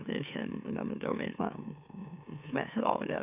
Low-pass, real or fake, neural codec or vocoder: 3.6 kHz; fake; autoencoder, 44.1 kHz, a latent of 192 numbers a frame, MeloTTS